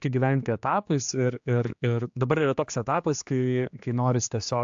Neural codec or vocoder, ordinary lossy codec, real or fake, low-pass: codec, 16 kHz, 2 kbps, X-Codec, HuBERT features, trained on general audio; AAC, 64 kbps; fake; 7.2 kHz